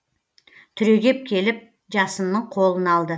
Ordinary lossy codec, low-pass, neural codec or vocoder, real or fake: none; none; none; real